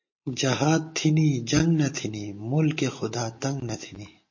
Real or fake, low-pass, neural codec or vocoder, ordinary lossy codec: real; 7.2 kHz; none; MP3, 32 kbps